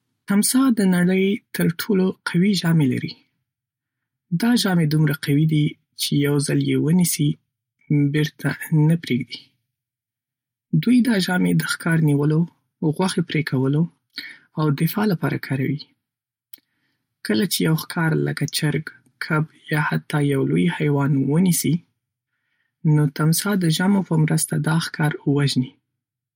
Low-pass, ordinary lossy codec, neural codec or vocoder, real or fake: 19.8 kHz; MP3, 64 kbps; none; real